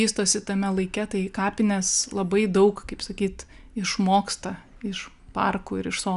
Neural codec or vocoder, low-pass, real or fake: none; 10.8 kHz; real